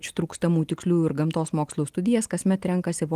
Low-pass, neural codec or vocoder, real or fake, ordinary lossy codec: 14.4 kHz; none; real; Opus, 24 kbps